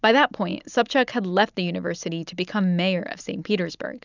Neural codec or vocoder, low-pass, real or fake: none; 7.2 kHz; real